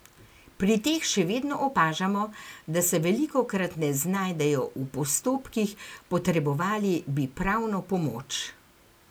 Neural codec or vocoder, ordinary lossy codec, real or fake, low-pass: none; none; real; none